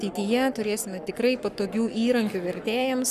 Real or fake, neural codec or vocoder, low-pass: fake; codec, 44.1 kHz, 7.8 kbps, Pupu-Codec; 14.4 kHz